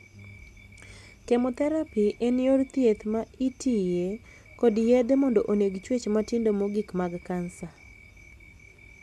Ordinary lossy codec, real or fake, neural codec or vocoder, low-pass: none; real; none; none